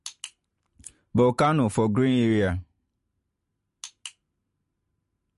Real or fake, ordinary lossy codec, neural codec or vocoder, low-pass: fake; MP3, 48 kbps; vocoder, 44.1 kHz, 128 mel bands every 512 samples, BigVGAN v2; 14.4 kHz